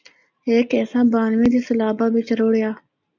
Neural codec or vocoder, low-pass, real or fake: none; 7.2 kHz; real